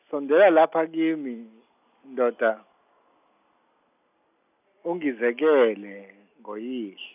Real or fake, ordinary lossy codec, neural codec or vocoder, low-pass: real; none; none; 3.6 kHz